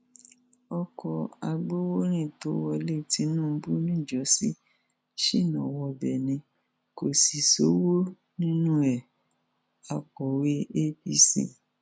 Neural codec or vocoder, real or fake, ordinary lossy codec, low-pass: none; real; none; none